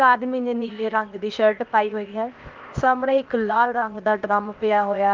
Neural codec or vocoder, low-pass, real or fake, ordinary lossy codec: codec, 16 kHz, 0.8 kbps, ZipCodec; 7.2 kHz; fake; Opus, 32 kbps